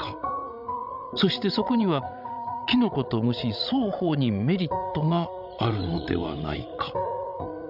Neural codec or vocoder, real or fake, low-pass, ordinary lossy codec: codec, 16 kHz, 16 kbps, FreqCodec, larger model; fake; 5.4 kHz; none